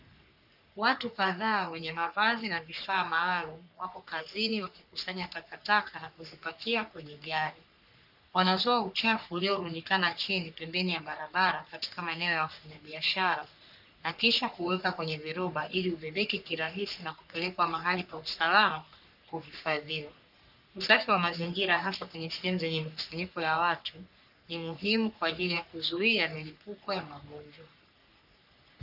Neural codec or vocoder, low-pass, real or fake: codec, 44.1 kHz, 3.4 kbps, Pupu-Codec; 5.4 kHz; fake